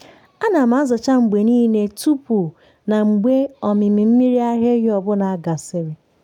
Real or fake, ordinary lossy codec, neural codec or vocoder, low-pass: real; none; none; 19.8 kHz